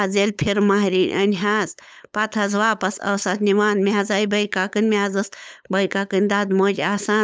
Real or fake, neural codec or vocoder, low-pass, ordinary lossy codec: fake; codec, 16 kHz, 8 kbps, FunCodec, trained on LibriTTS, 25 frames a second; none; none